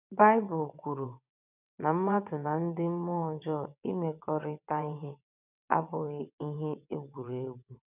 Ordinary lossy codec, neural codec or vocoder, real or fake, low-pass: none; vocoder, 22.05 kHz, 80 mel bands, WaveNeXt; fake; 3.6 kHz